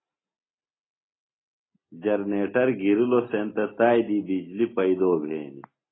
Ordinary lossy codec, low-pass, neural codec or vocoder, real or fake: AAC, 16 kbps; 7.2 kHz; none; real